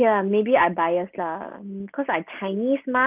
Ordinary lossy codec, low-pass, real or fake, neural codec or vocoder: Opus, 24 kbps; 3.6 kHz; real; none